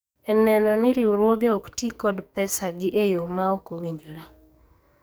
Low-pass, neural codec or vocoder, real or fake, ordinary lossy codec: none; codec, 44.1 kHz, 2.6 kbps, SNAC; fake; none